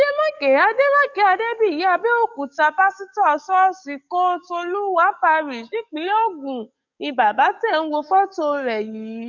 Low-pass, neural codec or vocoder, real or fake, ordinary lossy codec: 7.2 kHz; codec, 44.1 kHz, 7.8 kbps, DAC; fake; none